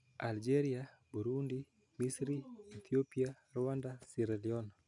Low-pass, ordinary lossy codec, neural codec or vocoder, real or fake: 10.8 kHz; none; none; real